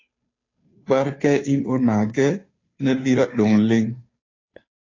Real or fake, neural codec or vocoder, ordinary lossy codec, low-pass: fake; codec, 16 kHz, 2 kbps, FunCodec, trained on Chinese and English, 25 frames a second; AAC, 32 kbps; 7.2 kHz